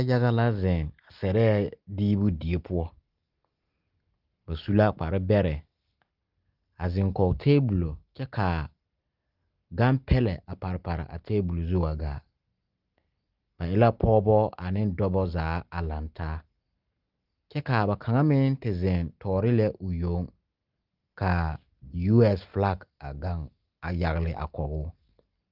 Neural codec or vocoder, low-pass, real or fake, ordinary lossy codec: none; 5.4 kHz; real; Opus, 32 kbps